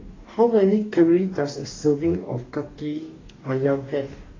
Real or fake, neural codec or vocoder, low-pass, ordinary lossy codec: fake; codec, 44.1 kHz, 2.6 kbps, DAC; 7.2 kHz; AAC, 32 kbps